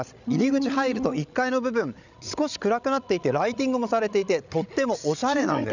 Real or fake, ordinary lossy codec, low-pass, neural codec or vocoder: fake; none; 7.2 kHz; codec, 16 kHz, 16 kbps, FreqCodec, larger model